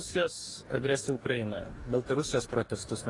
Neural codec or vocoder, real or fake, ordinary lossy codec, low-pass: codec, 44.1 kHz, 2.6 kbps, DAC; fake; AAC, 32 kbps; 10.8 kHz